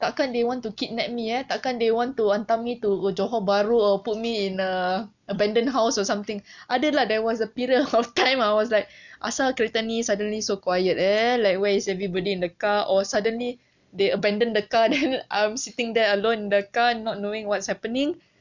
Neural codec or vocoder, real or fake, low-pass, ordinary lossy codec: none; real; 7.2 kHz; none